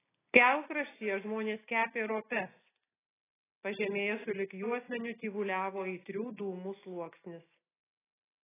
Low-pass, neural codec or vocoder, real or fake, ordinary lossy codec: 3.6 kHz; none; real; AAC, 16 kbps